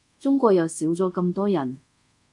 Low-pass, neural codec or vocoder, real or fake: 10.8 kHz; codec, 24 kHz, 0.5 kbps, DualCodec; fake